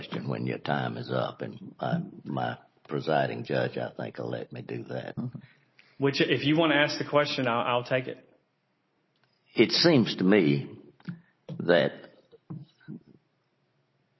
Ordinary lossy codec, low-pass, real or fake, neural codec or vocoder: MP3, 24 kbps; 7.2 kHz; real; none